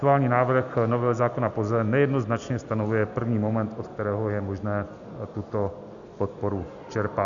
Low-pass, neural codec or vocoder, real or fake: 7.2 kHz; none; real